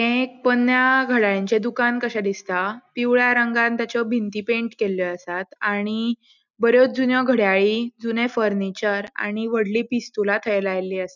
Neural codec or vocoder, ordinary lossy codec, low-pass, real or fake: none; none; 7.2 kHz; real